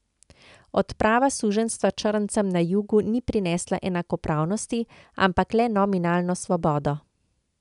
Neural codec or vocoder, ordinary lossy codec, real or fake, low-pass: none; none; real; 10.8 kHz